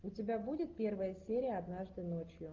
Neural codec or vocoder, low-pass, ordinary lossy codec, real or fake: none; 7.2 kHz; Opus, 32 kbps; real